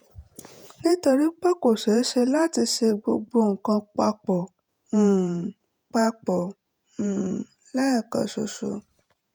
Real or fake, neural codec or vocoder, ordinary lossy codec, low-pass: fake; vocoder, 48 kHz, 128 mel bands, Vocos; none; none